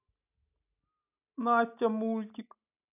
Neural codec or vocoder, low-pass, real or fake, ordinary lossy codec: none; 3.6 kHz; real; none